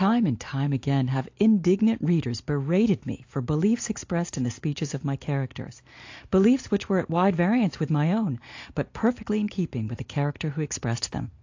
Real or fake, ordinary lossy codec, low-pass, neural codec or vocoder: real; AAC, 48 kbps; 7.2 kHz; none